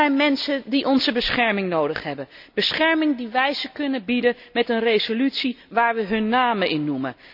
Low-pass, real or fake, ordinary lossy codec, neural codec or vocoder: 5.4 kHz; real; none; none